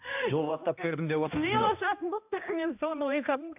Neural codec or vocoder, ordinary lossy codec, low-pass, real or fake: codec, 16 kHz, 1 kbps, X-Codec, HuBERT features, trained on balanced general audio; AAC, 32 kbps; 3.6 kHz; fake